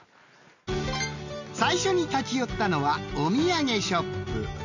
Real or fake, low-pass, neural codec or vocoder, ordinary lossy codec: real; 7.2 kHz; none; none